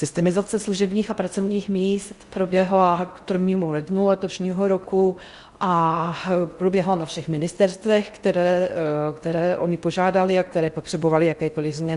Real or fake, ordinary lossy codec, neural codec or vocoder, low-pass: fake; Opus, 64 kbps; codec, 16 kHz in and 24 kHz out, 0.6 kbps, FocalCodec, streaming, 4096 codes; 10.8 kHz